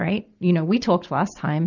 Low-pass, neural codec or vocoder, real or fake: 7.2 kHz; none; real